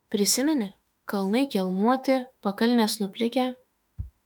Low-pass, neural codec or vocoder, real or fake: 19.8 kHz; autoencoder, 48 kHz, 32 numbers a frame, DAC-VAE, trained on Japanese speech; fake